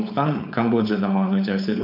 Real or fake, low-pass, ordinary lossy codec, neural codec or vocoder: fake; 5.4 kHz; none; codec, 16 kHz, 4.8 kbps, FACodec